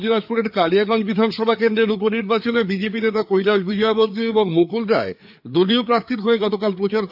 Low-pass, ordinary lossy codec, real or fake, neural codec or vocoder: 5.4 kHz; AAC, 48 kbps; fake; codec, 16 kHz, 4 kbps, FreqCodec, larger model